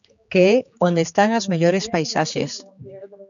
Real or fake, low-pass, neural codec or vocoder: fake; 7.2 kHz; codec, 16 kHz, 4 kbps, X-Codec, HuBERT features, trained on general audio